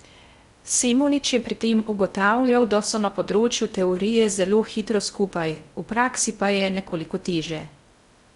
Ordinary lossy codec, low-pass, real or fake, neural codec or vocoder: Opus, 64 kbps; 10.8 kHz; fake; codec, 16 kHz in and 24 kHz out, 0.6 kbps, FocalCodec, streaming, 2048 codes